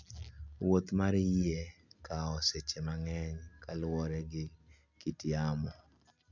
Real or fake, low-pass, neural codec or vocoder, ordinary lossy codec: real; 7.2 kHz; none; none